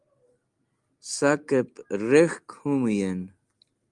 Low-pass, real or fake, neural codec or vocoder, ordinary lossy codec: 10.8 kHz; real; none; Opus, 32 kbps